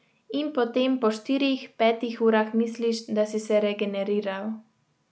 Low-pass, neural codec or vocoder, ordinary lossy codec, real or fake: none; none; none; real